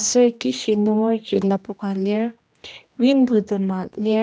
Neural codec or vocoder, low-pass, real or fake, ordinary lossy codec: codec, 16 kHz, 1 kbps, X-Codec, HuBERT features, trained on general audio; none; fake; none